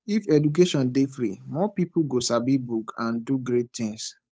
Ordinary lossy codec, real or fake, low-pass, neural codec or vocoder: none; fake; none; codec, 16 kHz, 8 kbps, FunCodec, trained on Chinese and English, 25 frames a second